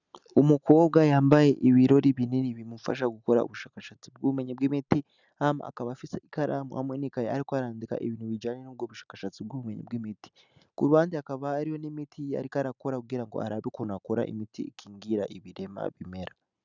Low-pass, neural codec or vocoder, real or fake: 7.2 kHz; none; real